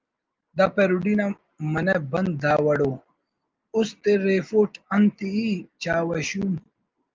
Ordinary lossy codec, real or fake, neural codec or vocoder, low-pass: Opus, 32 kbps; real; none; 7.2 kHz